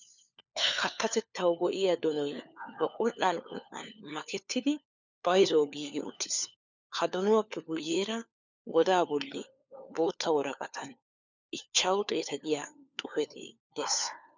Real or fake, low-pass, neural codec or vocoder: fake; 7.2 kHz; codec, 16 kHz, 4 kbps, FunCodec, trained on LibriTTS, 50 frames a second